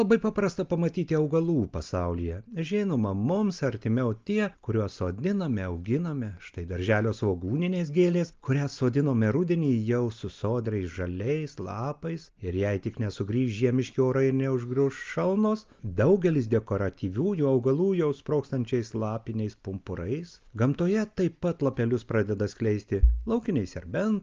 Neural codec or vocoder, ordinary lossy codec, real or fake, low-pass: none; Opus, 24 kbps; real; 7.2 kHz